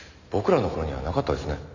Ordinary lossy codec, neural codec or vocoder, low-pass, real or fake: none; none; 7.2 kHz; real